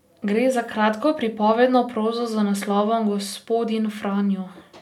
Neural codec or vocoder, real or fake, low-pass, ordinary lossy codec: none; real; 19.8 kHz; none